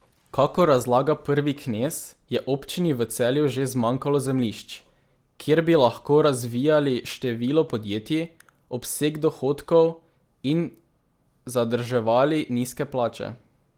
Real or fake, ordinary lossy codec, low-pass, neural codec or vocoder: real; Opus, 24 kbps; 19.8 kHz; none